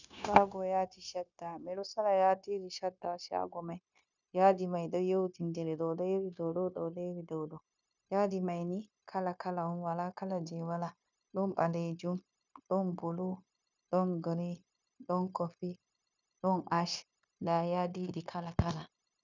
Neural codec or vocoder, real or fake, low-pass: codec, 16 kHz, 0.9 kbps, LongCat-Audio-Codec; fake; 7.2 kHz